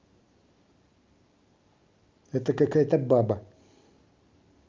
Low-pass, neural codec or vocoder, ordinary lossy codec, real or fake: 7.2 kHz; none; Opus, 24 kbps; real